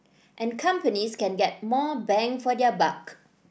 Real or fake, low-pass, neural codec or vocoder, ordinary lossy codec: real; none; none; none